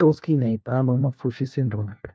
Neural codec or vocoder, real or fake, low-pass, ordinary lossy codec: codec, 16 kHz, 1 kbps, FunCodec, trained on LibriTTS, 50 frames a second; fake; none; none